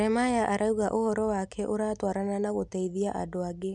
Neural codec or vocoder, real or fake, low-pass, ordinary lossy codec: none; real; 10.8 kHz; none